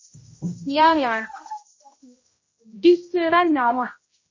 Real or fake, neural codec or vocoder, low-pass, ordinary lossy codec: fake; codec, 16 kHz, 0.5 kbps, X-Codec, HuBERT features, trained on general audio; 7.2 kHz; MP3, 32 kbps